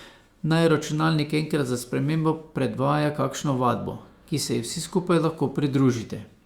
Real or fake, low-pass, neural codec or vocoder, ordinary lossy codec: fake; 19.8 kHz; autoencoder, 48 kHz, 128 numbers a frame, DAC-VAE, trained on Japanese speech; Opus, 64 kbps